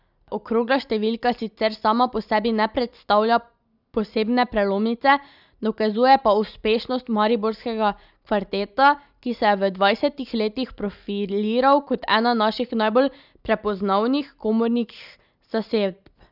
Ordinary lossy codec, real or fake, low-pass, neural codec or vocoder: none; real; 5.4 kHz; none